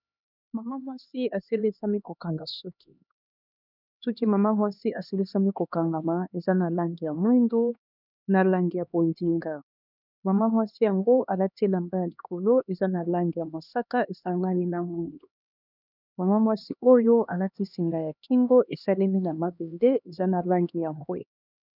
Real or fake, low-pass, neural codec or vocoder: fake; 5.4 kHz; codec, 16 kHz, 2 kbps, X-Codec, HuBERT features, trained on LibriSpeech